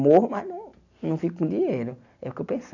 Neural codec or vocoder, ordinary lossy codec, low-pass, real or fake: none; none; 7.2 kHz; real